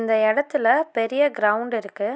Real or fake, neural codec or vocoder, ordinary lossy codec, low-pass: real; none; none; none